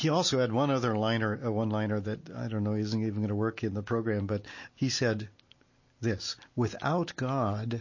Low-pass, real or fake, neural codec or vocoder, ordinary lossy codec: 7.2 kHz; real; none; MP3, 32 kbps